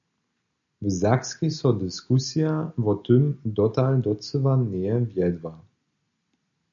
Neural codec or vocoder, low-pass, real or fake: none; 7.2 kHz; real